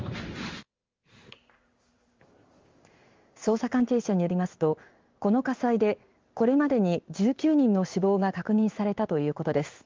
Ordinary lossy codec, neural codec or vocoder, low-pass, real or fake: Opus, 32 kbps; codec, 16 kHz in and 24 kHz out, 1 kbps, XY-Tokenizer; 7.2 kHz; fake